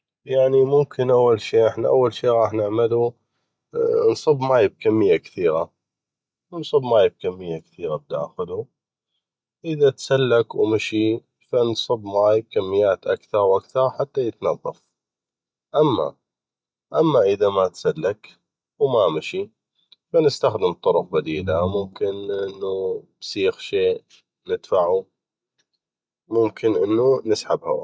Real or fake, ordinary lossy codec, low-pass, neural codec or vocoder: real; none; none; none